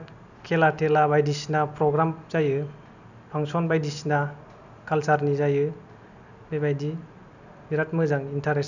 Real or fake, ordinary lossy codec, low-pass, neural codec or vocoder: real; none; 7.2 kHz; none